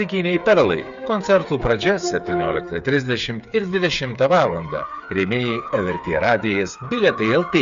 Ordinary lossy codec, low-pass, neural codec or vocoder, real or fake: Opus, 64 kbps; 7.2 kHz; codec, 16 kHz, 8 kbps, FreqCodec, smaller model; fake